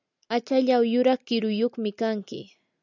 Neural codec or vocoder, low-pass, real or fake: none; 7.2 kHz; real